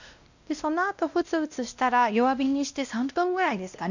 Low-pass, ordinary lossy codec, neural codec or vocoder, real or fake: 7.2 kHz; none; codec, 16 kHz, 1 kbps, X-Codec, WavLM features, trained on Multilingual LibriSpeech; fake